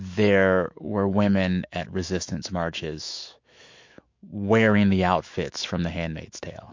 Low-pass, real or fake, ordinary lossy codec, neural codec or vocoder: 7.2 kHz; fake; MP3, 48 kbps; autoencoder, 48 kHz, 128 numbers a frame, DAC-VAE, trained on Japanese speech